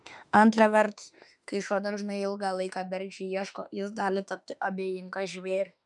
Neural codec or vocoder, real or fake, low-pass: autoencoder, 48 kHz, 32 numbers a frame, DAC-VAE, trained on Japanese speech; fake; 10.8 kHz